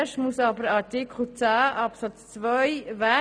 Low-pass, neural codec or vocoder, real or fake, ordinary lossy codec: 9.9 kHz; none; real; none